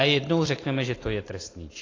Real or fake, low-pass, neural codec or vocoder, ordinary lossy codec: real; 7.2 kHz; none; AAC, 32 kbps